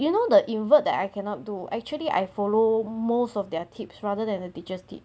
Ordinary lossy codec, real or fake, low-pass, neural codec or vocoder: none; real; none; none